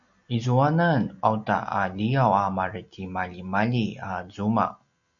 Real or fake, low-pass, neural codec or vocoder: real; 7.2 kHz; none